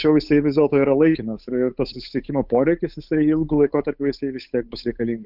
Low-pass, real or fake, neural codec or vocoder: 5.4 kHz; fake; codec, 16 kHz, 8 kbps, FunCodec, trained on Chinese and English, 25 frames a second